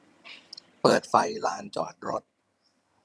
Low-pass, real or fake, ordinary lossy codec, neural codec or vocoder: none; fake; none; vocoder, 22.05 kHz, 80 mel bands, HiFi-GAN